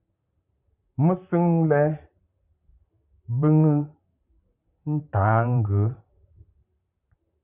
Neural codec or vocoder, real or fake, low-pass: vocoder, 44.1 kHz, 128 mel bands, Pupu-Vocoder; fake; 3.6 kHz